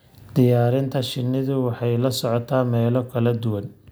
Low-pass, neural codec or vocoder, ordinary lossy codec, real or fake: none; none; none; real